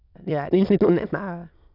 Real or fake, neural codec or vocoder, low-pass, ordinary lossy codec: fake; autoencoder, 22.05 kHz, a latent of 192 numbers a frame, VITS, trained on many speakers; 5.4 kHz; none